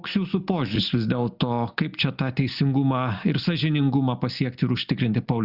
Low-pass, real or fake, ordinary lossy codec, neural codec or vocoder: 5.4 kHz; real; Opus, 64 kbps; none